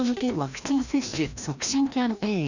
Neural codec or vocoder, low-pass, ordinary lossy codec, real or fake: codec, 16 kHz, 1 kbps, FreqCodec, larger model; 7.2 kHz; none; fake